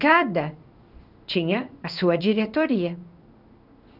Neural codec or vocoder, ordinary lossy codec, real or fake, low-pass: codec, 16 kHz in and 24 kHz out, 1 kbps, XY-Tokenizer; none; fake; 5.4 kHz